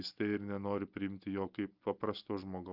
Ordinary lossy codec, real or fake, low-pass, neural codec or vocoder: Opus, 32 kbps; real; 5.4 kHz; none